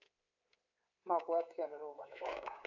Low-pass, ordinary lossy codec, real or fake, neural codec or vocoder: 7.2 kHz; none; fake; codec, 24 kHz, 3.1 kbps, DualCodec